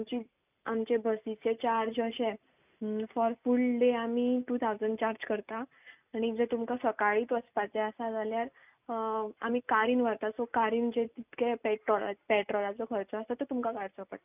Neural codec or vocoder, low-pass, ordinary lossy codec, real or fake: none; 3.6 kHz; none; real